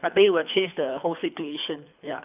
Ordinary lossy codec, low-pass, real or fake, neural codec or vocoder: none; 3.6 kHz; fake; codec, 24 kHz, 3 kbps, HILCodec